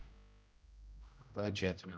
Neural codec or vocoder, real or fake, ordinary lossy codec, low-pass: codec, 16 kHz, 0.5 kbps, X-Codec, HuBERT features, trained on general audio; fake; none; none